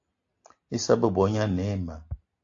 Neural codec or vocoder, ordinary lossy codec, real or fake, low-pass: none; AAC, 48 kbps; real; 7.2 kHz